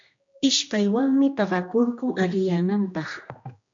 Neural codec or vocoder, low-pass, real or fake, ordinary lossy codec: codec, 16 kHz, 1 kbps, X-Codec, HuBERT features, trained on general audio; 7.2 kHz; fake; MP3, 48 kbps